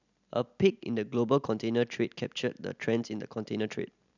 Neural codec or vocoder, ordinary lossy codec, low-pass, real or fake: none; none; 7.2 kHz; real